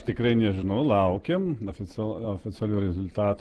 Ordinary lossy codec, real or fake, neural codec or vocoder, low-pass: Opus, 16 kbps; real; none; 10.8 kHz